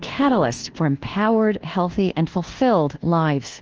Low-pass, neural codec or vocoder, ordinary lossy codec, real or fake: 7.2 kHz; codec, 24 kHz, 1.2 kbps, DualCodec; Opus, 16 kbps; fake